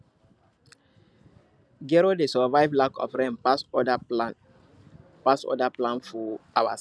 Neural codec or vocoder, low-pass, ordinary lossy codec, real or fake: none; none; none; real